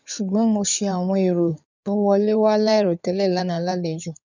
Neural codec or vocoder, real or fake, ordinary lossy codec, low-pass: codec, 16 kHz in and 24 kHz out, 2.2 kbps, FireRedTTS-2 codec; fake; none; 7.2 kHz